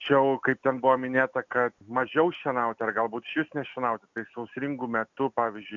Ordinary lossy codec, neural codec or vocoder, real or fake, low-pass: MP3, 64 kbps; none; real; 7.2 kHz